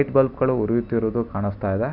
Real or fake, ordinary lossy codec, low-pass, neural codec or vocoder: real; none; 5.4 kHz; none